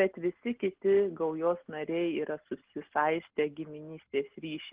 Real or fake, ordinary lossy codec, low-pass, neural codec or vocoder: real; Opus, 16 kbps; 3.6 kHz; none